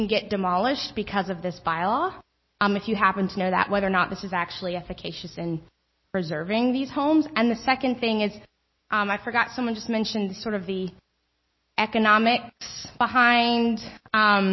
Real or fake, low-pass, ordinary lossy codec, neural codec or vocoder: real; 7.2 kHz; MP3, 24 kbps; none